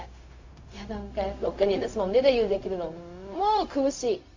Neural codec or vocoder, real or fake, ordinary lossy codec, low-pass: codec, 16 kHz, 0.4 kbps, LongCat-Audio-Codec; fake; AAC, 48 kbps; 7.2 kHz